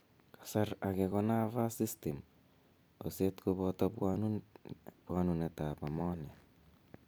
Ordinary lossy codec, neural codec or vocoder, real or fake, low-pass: none; vocoder, 44.1 kHz, 128 mel bands every 256 samples, BigVGAN v2; fake; none